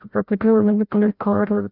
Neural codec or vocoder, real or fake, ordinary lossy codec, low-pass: codec, 16 kHz, 0.5 kbps, FreqCodec, larger model; fake; none; 5.4 kHz